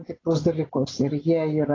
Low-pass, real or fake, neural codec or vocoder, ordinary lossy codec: 7.2 kHz; real; none; AAC, 32 kbps